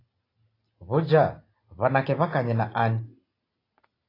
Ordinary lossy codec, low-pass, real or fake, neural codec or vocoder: AAC, 24 kbps; 5.4 kHz; real; none